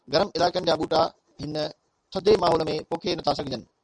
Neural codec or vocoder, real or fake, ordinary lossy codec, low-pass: none; real; MP3, 96 kbps; 9.9 kHz